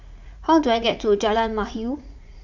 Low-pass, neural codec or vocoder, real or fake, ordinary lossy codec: 7.2 kHz; vocoder, 44.1 kHz, 80 mel bands, Vocos; fake; none